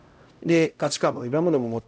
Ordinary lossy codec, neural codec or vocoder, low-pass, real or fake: none; codec, 16 kHz, 0.5 kbps, X-Codec, HuBERT features, trained on LibriSpeech; none; fake